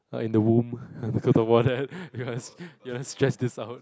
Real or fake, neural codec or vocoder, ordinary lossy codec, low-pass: real; none; none; none